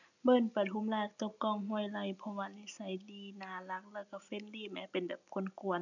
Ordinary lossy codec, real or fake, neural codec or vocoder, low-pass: none; real; none; 7.2 kHz